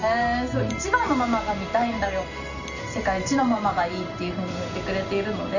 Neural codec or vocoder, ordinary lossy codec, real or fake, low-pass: none; none; real; 7.2 kHz